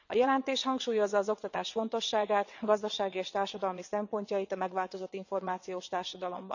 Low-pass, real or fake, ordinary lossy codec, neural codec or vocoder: 7.2 kHz; fake; none; vocoder, 22.05 kHz, 80 mel bands, WaveNeXt